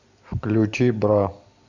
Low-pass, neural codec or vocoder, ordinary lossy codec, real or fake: 7.2 kHz; none; AAC, 48 kbps; real